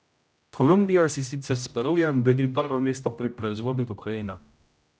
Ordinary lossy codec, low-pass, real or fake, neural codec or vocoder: none; none; fake; codec, 16 kHz, 0.5 kbps, X-Codec, HuBERT features, trained on general audio